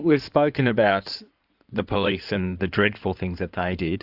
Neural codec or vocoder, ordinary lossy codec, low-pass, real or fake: codec, 16 kHz in and 24 kHz out, 2.2 kbps, FireRedTTS-2 codec; AAC, 48 kbps; 5.4 kHz; fake